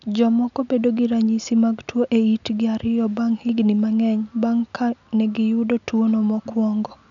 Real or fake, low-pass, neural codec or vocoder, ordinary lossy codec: real; 7.2 kHz; none; none